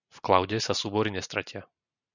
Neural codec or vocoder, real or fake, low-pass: none; real; 7.2 kHz